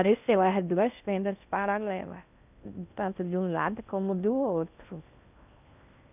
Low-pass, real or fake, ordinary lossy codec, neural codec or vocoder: 3.6 kHz; fake; none; codec, 16 kHz in and 24 kHz out, 0.6 kbps, FocalCodec, streaming, 4096 codes